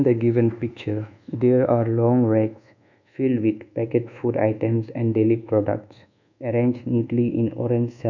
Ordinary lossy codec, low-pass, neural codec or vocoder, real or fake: none; 7.2 kHz; codec, 24 kHz, 1.2 kbps, DualCodec; fake